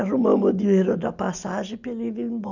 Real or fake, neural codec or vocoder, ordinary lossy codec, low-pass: real; none; none; 7.2 kHz